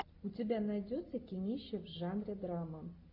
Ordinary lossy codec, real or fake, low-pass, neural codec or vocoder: AAC, 32 kbps; real; 5.4 kHz; none